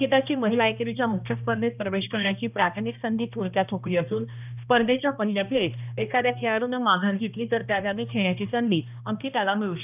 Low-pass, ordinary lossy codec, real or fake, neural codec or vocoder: 3.6 kHz; none; fake; codec, 16 kHz, 1 kbps, X-Codec, HuBERT features, trained on balanced general audio